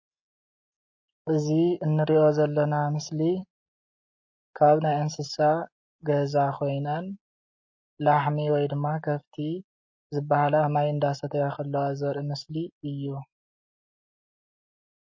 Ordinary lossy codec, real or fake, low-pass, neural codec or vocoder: MP3, 32 kbps; real; 7.2 kHz; none